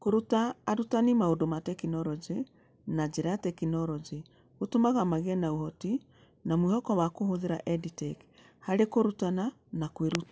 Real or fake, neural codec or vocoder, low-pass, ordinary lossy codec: real; none; none; none